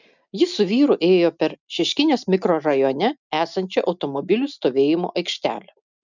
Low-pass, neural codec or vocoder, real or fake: 7.2 kHz; none; real